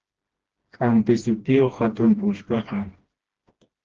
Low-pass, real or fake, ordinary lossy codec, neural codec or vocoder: 7.2 kHz; fake; Opus, 16 kbps; codec, 16 kHz, 1 kbps, FreqCodec, smaller model